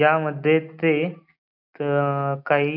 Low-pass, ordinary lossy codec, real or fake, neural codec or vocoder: 5.4 kHz; none; real; none